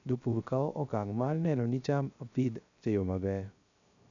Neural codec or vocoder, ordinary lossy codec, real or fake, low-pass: codec, 16 kHz, 0.3 kbps, FocalCodec; none; fake; 7.2 kHz